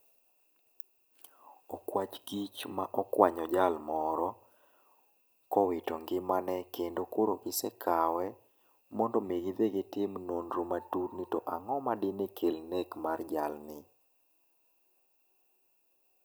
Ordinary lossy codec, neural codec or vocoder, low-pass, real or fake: none; none; none; real